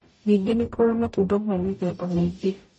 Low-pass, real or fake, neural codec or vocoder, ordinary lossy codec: 10.8 kHz; fake; codec, 44.1 kHz, 0.9 kbps, DAC; MP3, 32 kbps